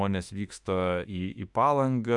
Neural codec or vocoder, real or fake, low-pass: autoencoder, 48 kHz, 32 numbers a frame, DAC-VAE, trained on Japanese speech; fake; 10.8 kHz